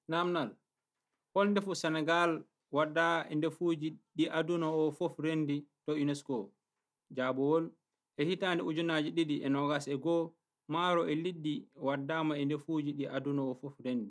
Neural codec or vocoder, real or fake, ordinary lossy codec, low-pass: none; real; none; none